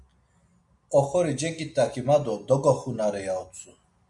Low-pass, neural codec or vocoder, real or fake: 10.8 kHz; none; real